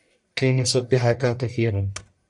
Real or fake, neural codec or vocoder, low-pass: fake; codec, 44.1 kHz, 1.7 kbps, Pupu-Codec; 10.8 kHz